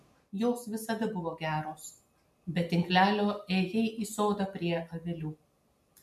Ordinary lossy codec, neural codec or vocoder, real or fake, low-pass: MP3, 64 kbps; none; real; 14.4 kHz